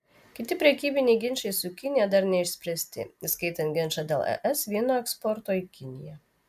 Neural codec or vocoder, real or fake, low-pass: none; real; 14.4 kHz